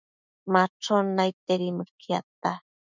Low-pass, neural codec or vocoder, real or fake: 7.2 kHz; autoencoder, 48 kHz, 128 numbers a frame, DAC-VAE, trained on Japanese speech; fake